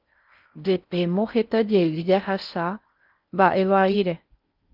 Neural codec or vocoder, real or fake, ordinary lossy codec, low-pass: codec, 16 kHz in and 24 kHz out, 0.6 kbps, FocalCodec, streaming, 4096 codes; fake; Opus, 24 kbps; 5.4 kHz